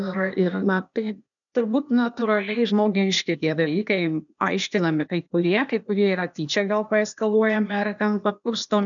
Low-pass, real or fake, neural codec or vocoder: 7.2 kHz; fake; codec, 16 kHz, 0.8 kbps, ZipCodec